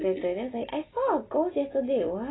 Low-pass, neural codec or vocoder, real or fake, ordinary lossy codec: 7.2 kHz; none; real; AAC, 16 kbps